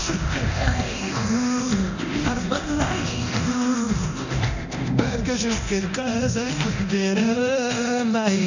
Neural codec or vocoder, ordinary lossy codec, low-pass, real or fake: codec, 24 kHz, 0.9 kbps, DualCodec; none; 7.2 kHz; fake